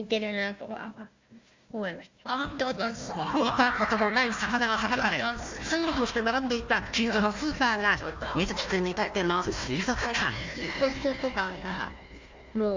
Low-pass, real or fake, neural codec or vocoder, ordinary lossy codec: 7.2 kHz; fake; codec, 16 kHz, 1 kbps, FunCodec, trained on Chinese and English, 50 frames a second; MP3, 64 kbps